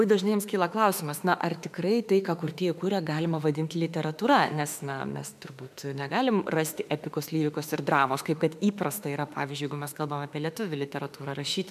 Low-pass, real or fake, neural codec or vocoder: 14.4 kHz; fake; autoencoder, 48 kHz, 32 numbers a frame, DAC-VAE, trained on Japanese speech